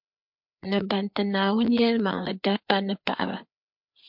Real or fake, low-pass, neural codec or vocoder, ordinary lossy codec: fake; 5.4 kHz; codec, 16 kHz, 4 kbps, FreqCodec, larger model; MP3, 48 kbps